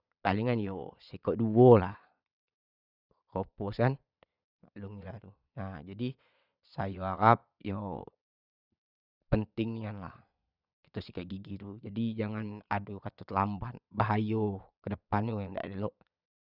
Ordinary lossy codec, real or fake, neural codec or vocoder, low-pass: none; fake; vocoder, 22.05 kHz, 80 mel bands, Vocos; 5.4 kHz